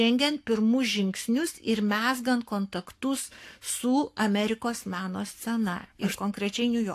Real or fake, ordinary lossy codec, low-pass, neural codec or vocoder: fake; AAC, 64 kbps; 14.4 kHz; codec, 44.1 kHz, 7.8 kbps, Pupu-Codec